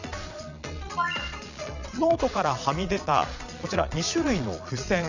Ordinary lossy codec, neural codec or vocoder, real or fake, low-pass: none; vocoder, 22.05 kHz, 80 mel bands, Vocos; fake; 7.2 kHz